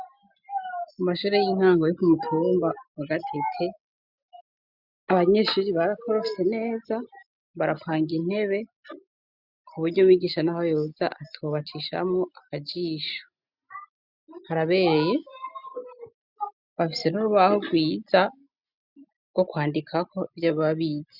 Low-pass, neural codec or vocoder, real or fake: 5.4 kHz; none; real